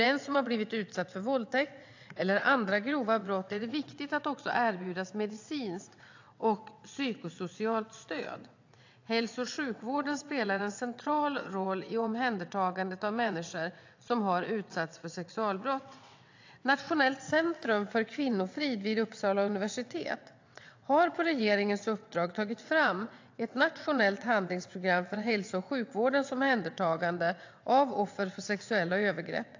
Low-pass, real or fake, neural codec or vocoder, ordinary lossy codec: 7.2 kHz; fake; vocoder, 22.05 kHz, 80 mel bands, WaveNeXt; AAC, 48 kbps